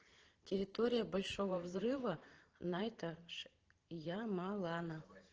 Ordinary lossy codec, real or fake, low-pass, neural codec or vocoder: Opus, 16 kbps; fake; 7.2 kHz; vocoder, 44.1 kHz, 128 mel bands every 512 samples, BigVGAN v2